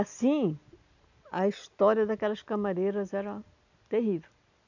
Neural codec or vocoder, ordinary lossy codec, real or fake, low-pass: none; none; real; 7.2 kHz